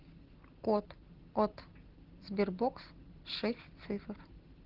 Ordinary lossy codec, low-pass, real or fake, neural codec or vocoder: Opus, 16 kbps; 5.4 kHz; real; none